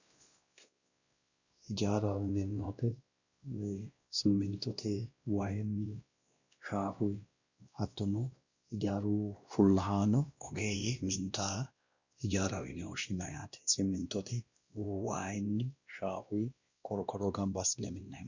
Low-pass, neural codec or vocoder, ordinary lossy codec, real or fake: 7.2 kHz; codec, 16 kHz, 1 kbps, X-Codec, WavLM features, trained on Multilingual LibriSpeech; Opus, 64 kbps; fake